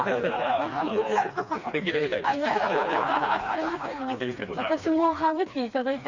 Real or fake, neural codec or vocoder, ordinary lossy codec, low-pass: fake; codec, 16 kHz, 2 kbps, FreqCodec, smaller model; Opus, 64 kbps; 7.2 kHz